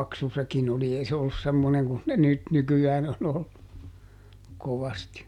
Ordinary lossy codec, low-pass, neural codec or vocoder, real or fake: none; 19.8 kHz; none; real